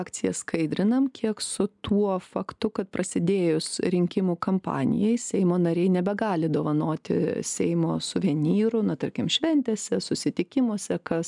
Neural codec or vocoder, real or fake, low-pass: none; real; 10.8 kHz